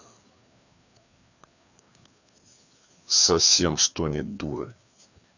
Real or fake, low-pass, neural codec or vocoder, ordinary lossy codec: fake; 7.2 kHz; codec, 16 kHz, 2 kbps, FreqCodec, larger model; none